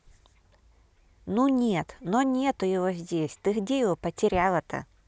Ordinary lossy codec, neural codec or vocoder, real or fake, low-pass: none; none; real; none